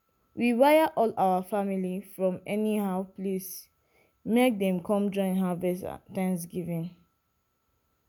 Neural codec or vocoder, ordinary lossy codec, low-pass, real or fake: none; none; none; real